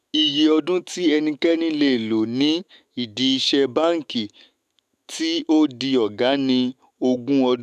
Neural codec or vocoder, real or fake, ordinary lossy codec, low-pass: autoencoder, 48 kHz, 128 numbers a frame, DAC-VAE, trained on Japanese speech; fake; none; 14.4 kHz